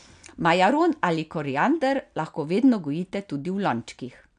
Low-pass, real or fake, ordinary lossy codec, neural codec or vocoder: 9.9 kHz; real; none; none